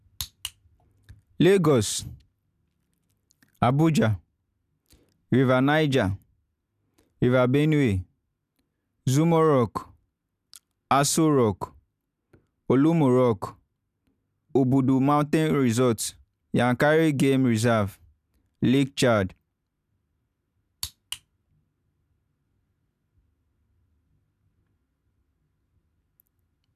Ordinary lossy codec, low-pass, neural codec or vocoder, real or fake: none; 14.4 kHz; none; real